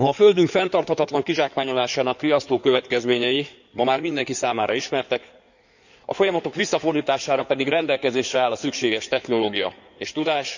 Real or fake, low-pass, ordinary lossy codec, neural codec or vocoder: fake; 7.2 kHz; none; codec, 16 kHz in and 24 kHz out, 2.2 kbps, FireRedTTS-2 codec